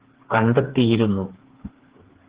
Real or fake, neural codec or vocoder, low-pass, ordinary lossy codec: fake; codec, 16 kHz, 4 kbps, FreqCodec, smaller model; 3.6 kHz; Opus, 16 kbps